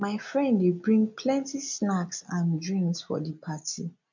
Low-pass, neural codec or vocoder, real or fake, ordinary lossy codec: 7.2 kHz; none; real; none